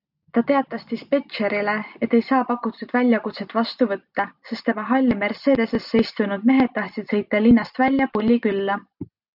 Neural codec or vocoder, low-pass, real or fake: none; 5.4 kHz; real